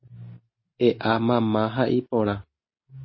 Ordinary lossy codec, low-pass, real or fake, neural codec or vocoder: MP3, 24 kbps; 7.2 kHz; real; none